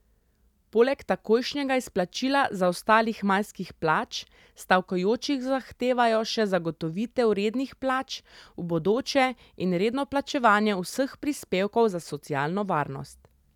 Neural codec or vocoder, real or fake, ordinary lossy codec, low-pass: none; real; none; 19.8 kHz